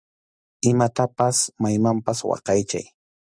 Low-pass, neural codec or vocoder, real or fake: 9.9 kHz; none; real